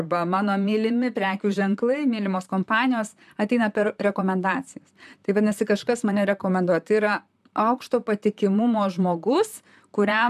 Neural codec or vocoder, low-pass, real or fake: vocoder, 44.1 kHz, 128 mel bands, Pupu-Vocoder; 14.4 kHz; fake